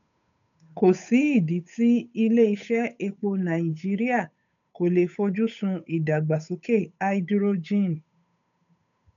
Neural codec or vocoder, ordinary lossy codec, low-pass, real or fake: codec, 16 kHz, 8 kbps, FunCodec, trained on Chinese and English, 25 frames a second; none; 7.2 kHz; fake